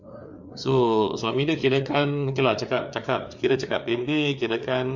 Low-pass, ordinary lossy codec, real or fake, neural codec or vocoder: 7.2 kHz; MP3, 48 kbps; fake; codec, 16 kHz, 4 kbps, FreqCodec, larger model